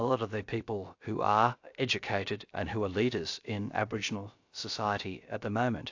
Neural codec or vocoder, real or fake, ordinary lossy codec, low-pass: codec, 16 kHz, about 1 kbps, DyCAST, with the encoder's durations; fake; AAC, 48 kbps; 7.2 kHz